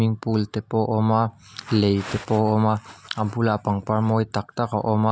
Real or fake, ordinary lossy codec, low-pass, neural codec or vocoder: real; none; none; none